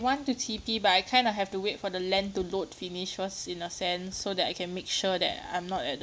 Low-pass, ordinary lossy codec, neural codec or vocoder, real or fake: none; none; none; real